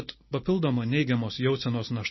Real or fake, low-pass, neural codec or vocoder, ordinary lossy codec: real; 7.2 kHz; none; MP3, 24 kbps